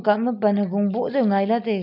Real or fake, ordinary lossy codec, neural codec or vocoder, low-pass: real; none; none; 5.4 kHz